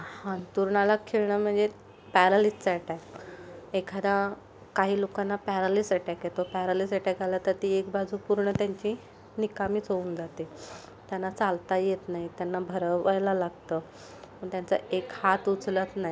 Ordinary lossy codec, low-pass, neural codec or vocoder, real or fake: none; none; none; real